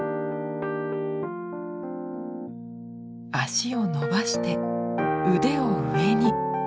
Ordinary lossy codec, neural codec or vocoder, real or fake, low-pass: none; none; real; none